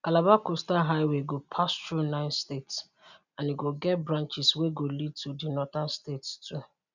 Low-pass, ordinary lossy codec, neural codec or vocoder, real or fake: 7.2 kHz; none; none; real